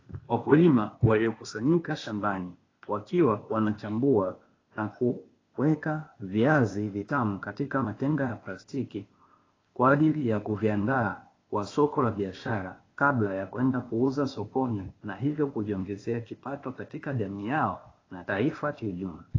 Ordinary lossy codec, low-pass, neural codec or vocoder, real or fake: AAC, 32 kbps; 7.2 kHz; codec, 16 kHz, 0.8 kbps, ZipCodec; fake